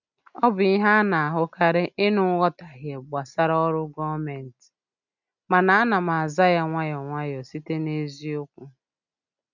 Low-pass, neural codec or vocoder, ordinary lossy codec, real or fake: 7.2 kHz; none; none; real